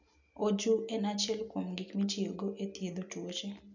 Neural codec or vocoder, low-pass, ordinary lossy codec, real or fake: none; 7.2 kHz; none; real